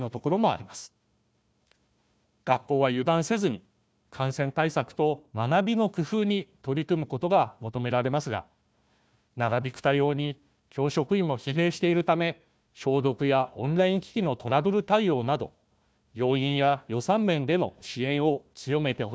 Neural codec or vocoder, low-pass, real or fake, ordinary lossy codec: codec, 16 kHz, 1 kbps, FunCodec, trained on Chinese and English, 50 frames a second; none; fake; none